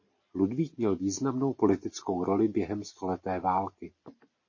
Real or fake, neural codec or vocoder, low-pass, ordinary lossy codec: real; none; 7.2 kHz; MP3, 32 kbps